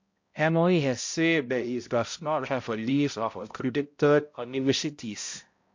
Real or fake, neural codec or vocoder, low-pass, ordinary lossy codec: fake; codec, 16 kHz, 0.5 kbps, X-Codec, HuBERT features, trained on balanced general audio; 7.2 kHz; MP3, 48 kbps